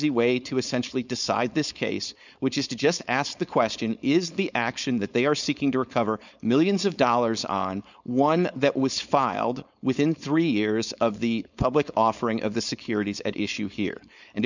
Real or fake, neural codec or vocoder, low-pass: fake; codec, 16 kHz, 4.8 kbps, FACodec; 7.2 kHz